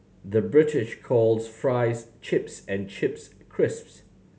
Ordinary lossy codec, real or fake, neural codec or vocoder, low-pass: none; real; none; none